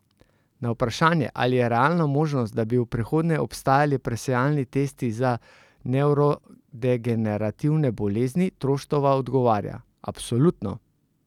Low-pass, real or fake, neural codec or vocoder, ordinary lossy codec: 19.8 kHz; real; none; none